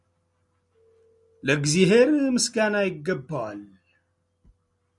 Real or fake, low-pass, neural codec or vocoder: real; 10.8 kHz; none